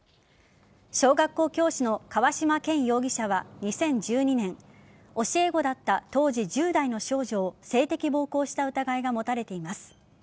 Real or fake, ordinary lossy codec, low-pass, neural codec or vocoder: real; none; none; none